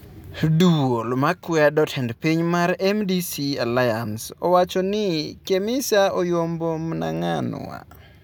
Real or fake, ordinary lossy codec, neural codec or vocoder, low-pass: real; none; none; none